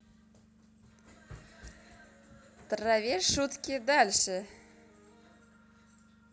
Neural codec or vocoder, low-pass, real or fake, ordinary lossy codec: none; none; real; none